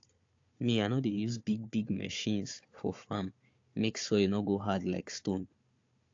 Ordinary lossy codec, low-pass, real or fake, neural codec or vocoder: AAC, 48 kbps; 7.2 kHz; fake; codec, 16 kHz, 4 kbps, FunCodec, trained on Chinese and English, 50 frames a second